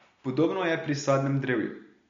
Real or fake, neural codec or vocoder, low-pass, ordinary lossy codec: real; none; 7.2 kHz; MP3, 48 kbps